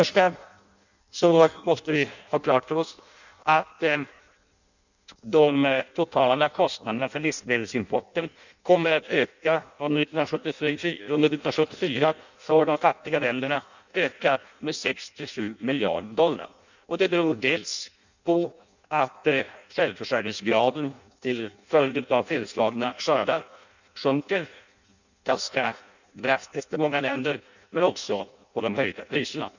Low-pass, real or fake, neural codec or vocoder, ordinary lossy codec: 7.2 kHz; fake; codec, 16 kHz in and 24 kHz out, 0.6 kbps, FireRedTTS-2 codec; none